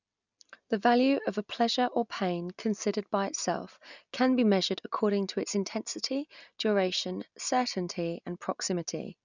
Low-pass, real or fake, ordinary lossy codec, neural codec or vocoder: 7.2 kHz; real; none; none